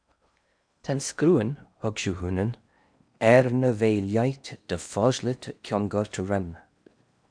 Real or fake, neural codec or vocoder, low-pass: fake; codec, 16 kHz in and 24 kHz out, 0.8 kbps, FocalCodec, streaming, 65536 codes; 9.9 kHz